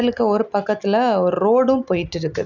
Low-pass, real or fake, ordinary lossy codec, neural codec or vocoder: 7.2 kHz; real; none; none